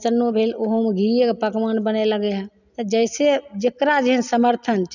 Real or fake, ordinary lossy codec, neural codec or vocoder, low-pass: real; none; none; 7.2 kHz